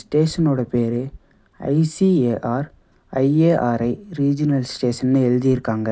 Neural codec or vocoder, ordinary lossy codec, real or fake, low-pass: none; none; real; none